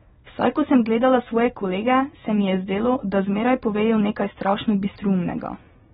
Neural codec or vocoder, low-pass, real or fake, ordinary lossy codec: none; 7.2 kHz; real; AAC, 16 kbps